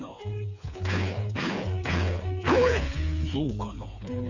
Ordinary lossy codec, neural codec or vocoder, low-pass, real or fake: none; codec, 16 kHz, 4 kbps, FreqCodec, smaller model; 7.2 kHz; fake